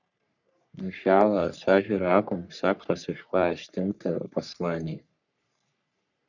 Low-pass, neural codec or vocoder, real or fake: 7.2 kHz; codec, 44.1 kHz, 3.4 kbps, Pupu-Codec; fake